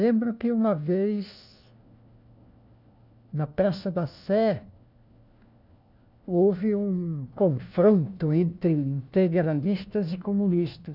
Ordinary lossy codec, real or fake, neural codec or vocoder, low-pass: none; fake; codec, 16 kHz, 1 kbps, FunCodec, trained on LibriTTS, 50 frames a second; 5.4 kHz